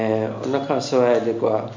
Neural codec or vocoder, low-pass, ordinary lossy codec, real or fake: none; 7.2 kHz; MP3, 48 kbps; real